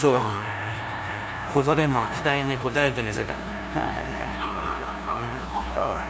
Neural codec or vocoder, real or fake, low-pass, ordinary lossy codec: codec, 16 kHz, 0.5 kbps, FunCodec, trained on LibriTTS, 25 frames a second; fake; none; none